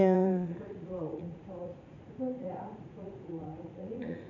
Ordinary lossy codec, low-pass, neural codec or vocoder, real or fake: none; 7.2 kHz; vocoder, 44.1 kHz, 80 mel bands, Vocos; fake